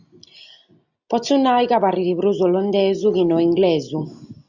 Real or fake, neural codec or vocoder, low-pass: real; none; 7.2 kHz